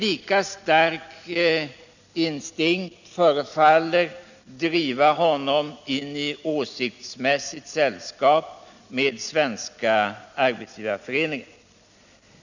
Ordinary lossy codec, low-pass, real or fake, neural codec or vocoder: none; 7.2 kHz; real; none